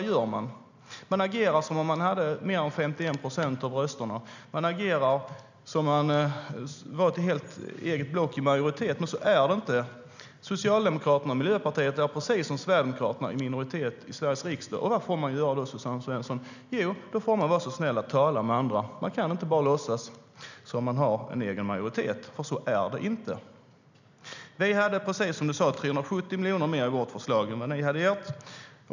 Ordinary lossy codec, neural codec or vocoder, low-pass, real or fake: none; none; 7.2 kHz; real